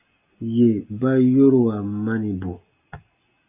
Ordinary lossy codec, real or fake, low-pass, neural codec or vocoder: AAC, 32 kbps; real; 3.6 kHz; none